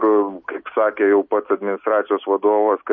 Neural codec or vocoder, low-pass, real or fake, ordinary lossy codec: none; 7.2 kHz; real; MP3, 32 kbps